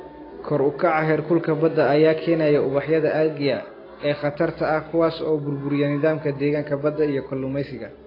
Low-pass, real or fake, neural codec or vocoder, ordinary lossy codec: 5.4 kHz; real; none; AAC, 24 kbps